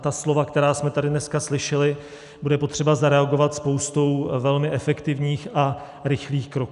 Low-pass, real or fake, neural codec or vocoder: 10.8 kHz; real; none